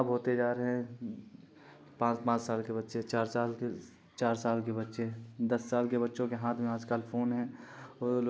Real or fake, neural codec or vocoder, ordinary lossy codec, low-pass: real; none; none; none